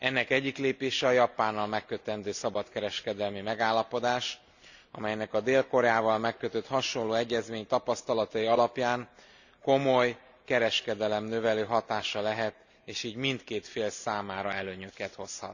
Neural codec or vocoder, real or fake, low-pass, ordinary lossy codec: none; real; 7.2 kHz; none